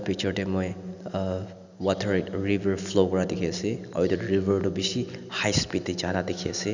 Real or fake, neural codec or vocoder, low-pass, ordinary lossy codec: real; none; 7.2 kHz; none